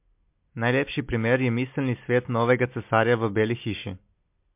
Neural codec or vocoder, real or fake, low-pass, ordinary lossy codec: none; real; 3.6 kHz; MP3, 32 kbps